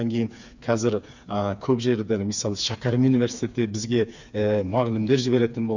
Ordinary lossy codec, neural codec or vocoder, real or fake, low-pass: none; codec, 16 kHz, 4 kbps, FreqCodec, smaller model; fake; 7.2 kHz